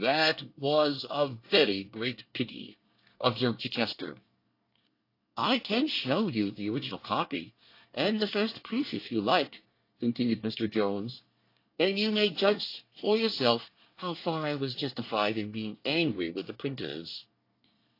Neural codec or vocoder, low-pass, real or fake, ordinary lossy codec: codec, 24 kHz, 1 kbps, SNAC; 5.4 kHz; fake; AAC, 32 kbps